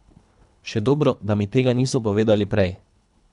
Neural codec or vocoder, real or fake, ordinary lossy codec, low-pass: codec, 24 kHz, 3 kbps, HILCodec; fake; none; 10.8 kHz